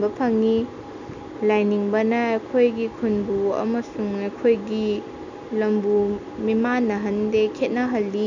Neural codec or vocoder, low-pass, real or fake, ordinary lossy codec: none; 7.2 kHz; real; none